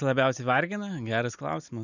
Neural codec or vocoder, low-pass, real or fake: none; 7.2 kHz; real